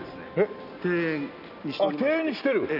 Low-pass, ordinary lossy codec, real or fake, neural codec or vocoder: 5.4 kHz; none; real; none